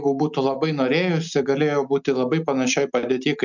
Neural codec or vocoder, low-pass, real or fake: none; 7.2 kHz; real